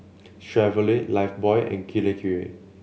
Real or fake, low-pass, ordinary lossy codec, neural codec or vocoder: real; none; none; none